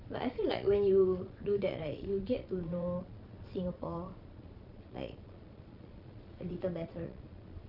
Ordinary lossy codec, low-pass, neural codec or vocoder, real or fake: none; 5.4 kHz; vocoder, 22.05 kHz, 80 mel bands, Vocos; fake